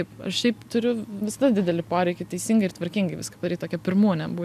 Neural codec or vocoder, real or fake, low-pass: none; real; 14.4 kHz